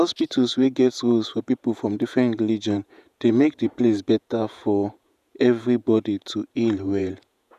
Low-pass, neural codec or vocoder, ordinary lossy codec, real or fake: 14.4 kHz; vocoder, 48 kHz, 128 mel bands, Vocos; none; fake